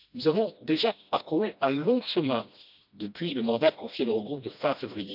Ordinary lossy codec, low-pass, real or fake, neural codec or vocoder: none; 5.4 kHz; fake; codec, 16 kHz, 1 kbps, FreqCodec, smaller model